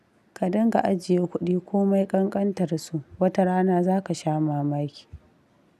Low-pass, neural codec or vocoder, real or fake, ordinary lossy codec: 14.4 kHz; none; real; none